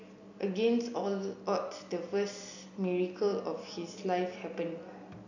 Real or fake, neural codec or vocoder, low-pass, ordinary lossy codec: real; none; 7.2 kHz; none